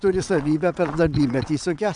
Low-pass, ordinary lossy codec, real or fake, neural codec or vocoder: 9.9 kHz; MP3, 96 kbps; fake; vocoder, 22.05 kHz, 80 mel bands, WaveNeXt